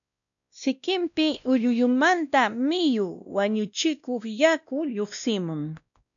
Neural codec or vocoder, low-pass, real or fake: codec, 16 kHz, 1 kbps, X-Codec, WavLM features, trained on Multilingual LibriSpeech; 7.2 kHz; fake